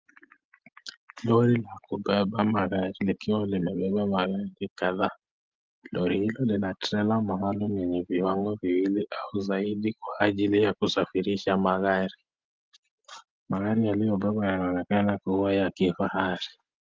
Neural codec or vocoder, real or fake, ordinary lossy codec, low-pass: none; real; Opus, 24 kbps; 7.2 kHz